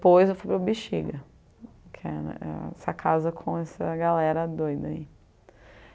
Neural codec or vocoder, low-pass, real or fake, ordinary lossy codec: none; none; real; none